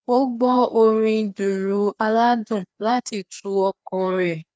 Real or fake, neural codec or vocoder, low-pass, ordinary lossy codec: fake; codec, 16 kHz, 2 kbps, FreqCodec, larger model; none; none